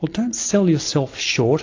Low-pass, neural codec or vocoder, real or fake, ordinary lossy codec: 7.2 kHz; none; real; AAC, 48 kbps